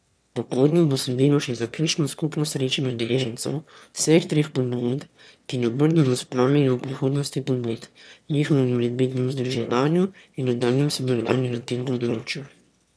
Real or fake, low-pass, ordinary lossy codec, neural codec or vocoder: fake; none; none; autoencoder, 22.05 kHz, a latent of 192 numbers a frame, VITS, trained on one speaker